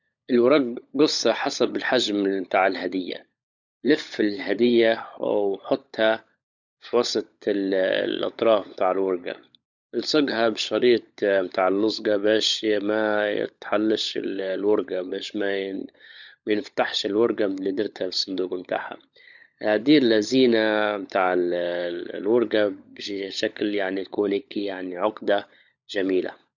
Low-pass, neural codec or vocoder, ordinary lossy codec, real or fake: 7.2 kHz; codec, 16 kHz, 16 kbps, FunCodec, trained on LibriTTS, 50 frames a second; none; fake